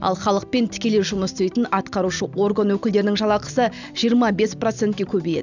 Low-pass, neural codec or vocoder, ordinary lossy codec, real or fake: 7.2 kHz; none; none; real